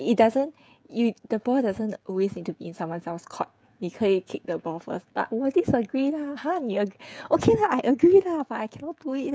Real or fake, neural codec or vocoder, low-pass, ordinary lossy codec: fake; codec, 16 kHz, 16 kbps, FreqCodec, smaller model; none; none